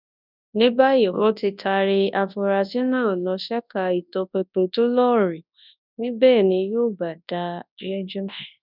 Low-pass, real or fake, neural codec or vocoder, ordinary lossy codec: 5.4 kHz; fake; codec, 24 kHz, 0.9 kbps, WavTokenizer, large speech release; none